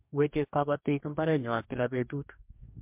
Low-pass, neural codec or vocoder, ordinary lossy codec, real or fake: 3.6 kHz; codec, 44.1 kHz, 2.6 kbps, DAC; MP3, 32 kbps; fake